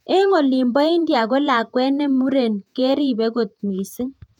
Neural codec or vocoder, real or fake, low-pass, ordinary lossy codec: vocoder, 48 kHz, 128 mel bands, Vocos; fake; 19.8 kHz; none